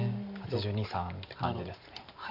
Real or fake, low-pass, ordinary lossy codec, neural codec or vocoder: real; 5.4 kHz; AAC, 48 kbps; none